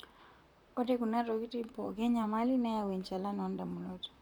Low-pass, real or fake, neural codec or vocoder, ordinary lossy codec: 19.8 kHz; fake; vocoder, 44.1 kHz, 128 mel bands, Pupu-Vocoder; none